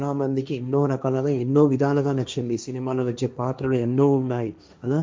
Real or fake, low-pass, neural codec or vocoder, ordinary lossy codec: fake; none; codec, 16 kHz, 1.1 kbps, Voila-Tokenizer; none